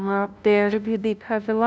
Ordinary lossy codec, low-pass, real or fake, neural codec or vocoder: none; none; fake; codec, 16 kHz, 0.5 kbps, FunCodec, trained on LibriTTS, 25 frames a second